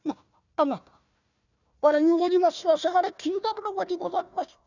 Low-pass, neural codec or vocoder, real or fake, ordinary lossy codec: 7.2 kHz; codec, 16 kHz, 1 kbps, FunCodec, trained on Chinese and English, 50 frames a second; fake; none